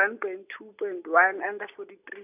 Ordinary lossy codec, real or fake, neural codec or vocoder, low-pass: none; real; none; 3.6 kHz